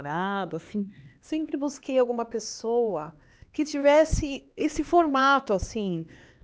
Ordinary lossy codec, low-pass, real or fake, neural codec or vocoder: none; none; fake; codec, 16 kHz, 2 kbps, X-Codec, HuBERT features, trained on LibriSpeech